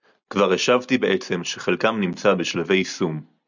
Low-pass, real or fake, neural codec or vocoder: 7.2 kHz; real; none